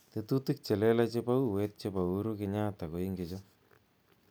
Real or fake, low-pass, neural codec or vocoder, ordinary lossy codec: real; none; none; none